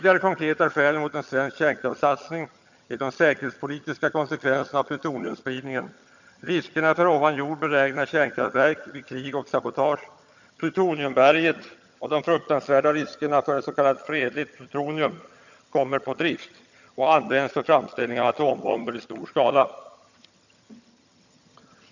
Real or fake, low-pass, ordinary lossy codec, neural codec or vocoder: fake; 7.2 kHz; none; vocoder, 22.05 kHz, 80 mel bands, HiFi-GAN